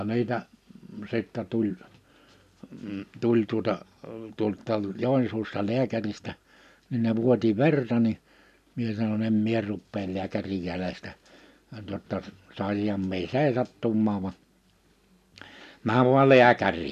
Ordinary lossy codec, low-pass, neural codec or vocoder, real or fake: none; 14.4 kHz; none; real